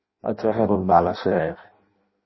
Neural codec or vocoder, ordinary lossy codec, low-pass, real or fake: codec, 16 kHz in and 24 kHz out, 0.6 kbps, FireRedTTS-2 codec; MP3, 24 kbps; 7.2 kHz; fake